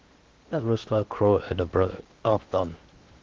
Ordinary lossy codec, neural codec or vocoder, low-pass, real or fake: Opus, 16 kbps; codec, 16 kHz in and 24 kHz out, 0.8 kbps, FocalCodec, streaming, 65536 codes; 7.2 kHz; fake